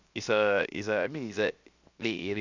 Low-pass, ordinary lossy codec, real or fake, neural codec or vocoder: 7.2 kHz; Opus, 64 kbps; fake; codec, 16 kHz, 0.7 kbps, FocalCodec